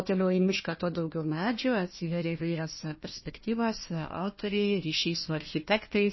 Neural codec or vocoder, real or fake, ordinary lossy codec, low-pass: codec, 16 kHz, 1 kbps, FunCodec, trained on Chinese and English, 50 frames a second; fake; MP3, 24 kbps; 7.2 kHz